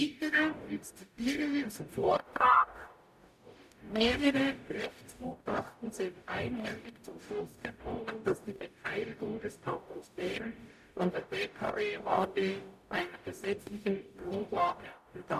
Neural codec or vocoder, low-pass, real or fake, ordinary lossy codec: codec, 44.1 kHz, 0.9 kbps, DAC; 14.4 kHz; fake; none